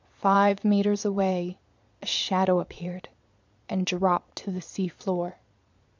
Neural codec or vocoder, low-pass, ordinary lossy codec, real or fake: vocoder, 22.05 kHz, 80 mel bands, Vocos; 7.2 kHz; MP3, 64 kbps; fake